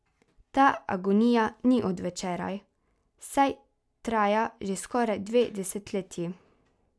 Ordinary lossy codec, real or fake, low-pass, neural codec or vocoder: none; real; none; none